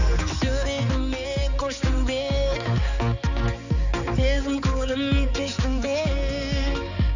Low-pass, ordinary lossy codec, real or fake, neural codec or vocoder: 7.2 kHz; none; fake; codec, 16 kHz, 4 kbps, X-Codec, HuBERT features, trained on balanced general audio